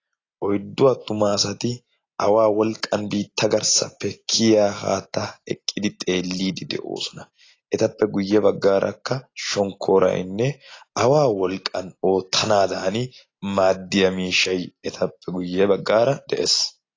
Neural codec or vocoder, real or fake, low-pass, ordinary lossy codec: none; real; 7.2 kHz; AAC, 32 kbps